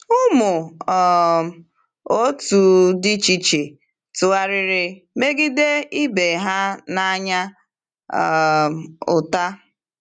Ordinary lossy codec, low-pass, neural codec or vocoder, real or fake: none; 9.9 kHz; none; real